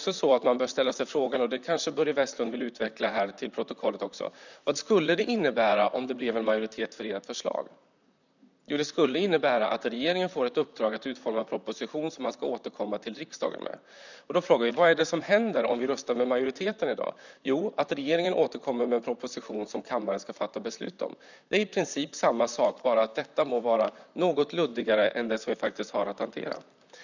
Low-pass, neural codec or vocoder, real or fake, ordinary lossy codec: 7.2 kHz; vocoder, 44.1 kHz, 128 mel bands, Pupu-Vocoder; fake; none